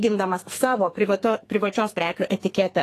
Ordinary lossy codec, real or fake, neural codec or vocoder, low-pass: AAC, 48 kbps; fake; codec, 44.1 kHz, 2.6 kbps, SNAC; 14.4 kHz